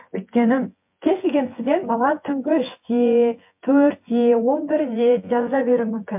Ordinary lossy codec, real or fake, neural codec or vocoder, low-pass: MP3, 32 kbps; fake; vocoder, 24 kHz, 100 mel bands, Vocos; 3.6 kHz